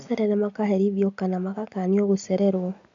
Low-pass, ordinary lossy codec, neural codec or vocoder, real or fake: 7.2 kHz; AAC, 64 kbps; none; real